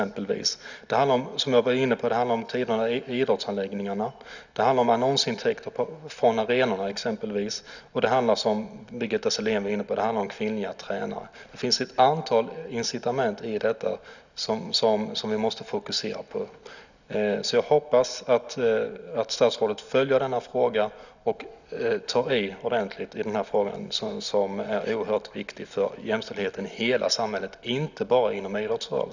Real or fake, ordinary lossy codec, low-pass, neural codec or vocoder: real; none; 7.2 kHz; none